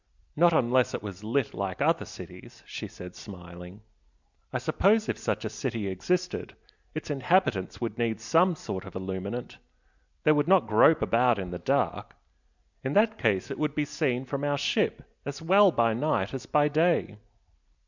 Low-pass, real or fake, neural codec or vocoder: 7.2 kHz; real; none